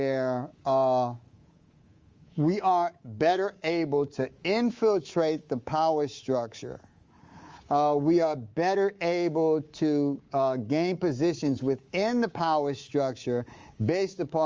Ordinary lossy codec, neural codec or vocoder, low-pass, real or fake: Opus, 32 kbps; codec, 24 kHz, 3.1 kbps, DualCodec; 7.2 kHz; fake